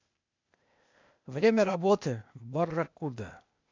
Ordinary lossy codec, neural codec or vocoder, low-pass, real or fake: MP3, 64 kbps; codec, 16 kHz, 0.8 kbps, ZipCodec; 7.2 kHz; fake